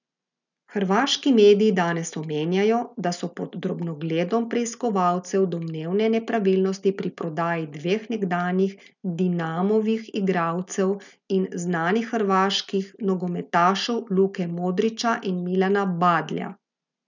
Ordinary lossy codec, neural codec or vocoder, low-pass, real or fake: none; none; 7.2 kHz; real